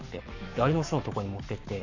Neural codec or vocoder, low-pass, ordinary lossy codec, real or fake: codec, 44.1 kHz, 7.8 kbps, Pupu-Codec; 7.2 kHz; none; fake